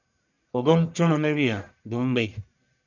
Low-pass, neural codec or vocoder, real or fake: 7.2 kHz; codec, 44.1 kHz, 1.7 kbps, Pupu-Codec; fake